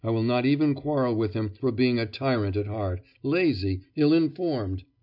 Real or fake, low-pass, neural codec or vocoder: real; 5.4 kHz; none